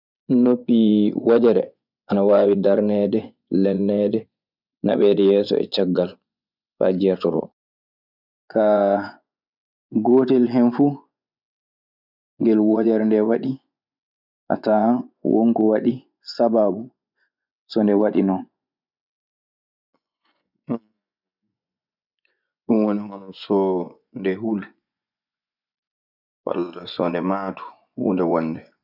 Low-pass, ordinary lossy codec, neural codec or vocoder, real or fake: 5.4 kHz; none; vocoder, 24 kHz, 100 mel bands, Vocos; fake